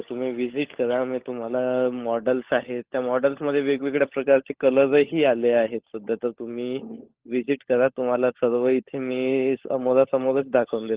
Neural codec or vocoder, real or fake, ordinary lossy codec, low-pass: codec, 16 kHz, 16 kbps, FunCodec, trained on LibriTTS, 50 frames a second; fake; Opus, 16 kbps; 3.6 kHz